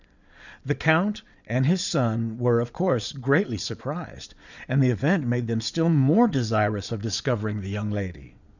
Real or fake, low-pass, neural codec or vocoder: fake; 7.2 kHz; vocoder, 22.05 kHz, 80 mel bands, Vocos